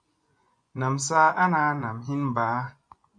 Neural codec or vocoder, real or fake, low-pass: vocoder, 24 kHz, 100 mel bands, Vocos; fake; 9.9 kHz